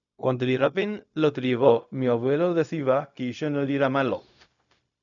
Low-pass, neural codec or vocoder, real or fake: 7.2 kHz; codec, 16 kHz, 0.4 kbps, LongCat-Audio-Codec; fake